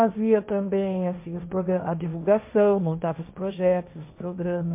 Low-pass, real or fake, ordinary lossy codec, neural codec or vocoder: 3.6 kHz; fake; MP3, 32 kbps; codec, 16 kHz, 1.1 kbps, Voila-Tokenizer